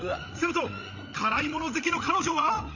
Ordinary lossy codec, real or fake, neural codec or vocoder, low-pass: none; fake; codec, 16 kHz, 8 kbps, FreqCodec, larger model; 7.2 kHz